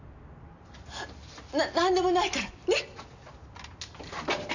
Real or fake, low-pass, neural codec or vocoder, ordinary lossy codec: real; 7.2 kHz; none; none